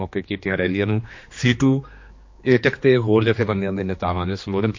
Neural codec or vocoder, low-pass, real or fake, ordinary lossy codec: codec, 16 kHz, 2 kbps, X-Codec, HuBERT features, trained on general audio; 7.2 kHz; fake; MP3, 48 kbps